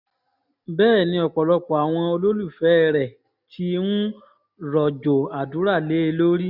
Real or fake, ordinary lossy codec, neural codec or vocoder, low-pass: real; none; none; 5.4 kHz